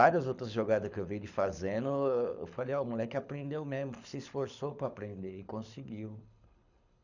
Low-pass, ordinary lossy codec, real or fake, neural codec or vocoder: 7.2 kHz; none; fake; codec, 24 kHz, 6 kbps, HILCodec